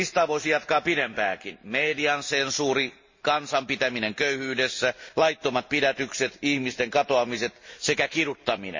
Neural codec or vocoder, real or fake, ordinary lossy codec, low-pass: none; real; MP3, 32 kbps; 7.2 kHz